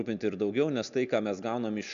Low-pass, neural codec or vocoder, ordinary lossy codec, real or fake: 7.2 kHz; none; MP3, 96 kbps; real